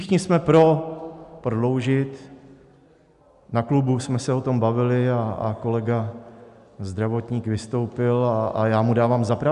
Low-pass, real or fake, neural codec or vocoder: 10.8 kHz; real; none